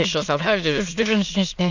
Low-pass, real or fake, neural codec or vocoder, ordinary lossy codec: 7.2 kHz; fake; autoencoder, 22.05 kHz, a latent of 192 numbers a frame, VITS, trained on many speakers; none